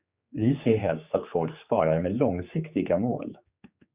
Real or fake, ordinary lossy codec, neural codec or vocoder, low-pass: fake; Opus, 64 kbps; codec, 16 kHz, 4 kbps, X-Codec, HuBERT features, trained on general audio; 3.6 kHz